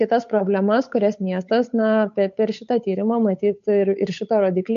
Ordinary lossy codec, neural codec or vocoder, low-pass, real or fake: MP3, 48 kbps; codec, 16 kHz, 8 kbps, FunCodec, trained on Chinese and English, 25 frames a second; 7.2 kHz; fake